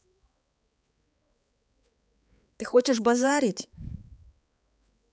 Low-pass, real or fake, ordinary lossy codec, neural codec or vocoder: none; fake; none; codec, 16 kHz, 4 kbps, X-Codec, HuBERT features, trained on balanced general audio